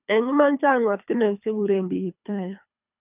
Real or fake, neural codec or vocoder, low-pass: fake; codec, 24 kHz, 3 kbps, HILCodec; 3.6 kHz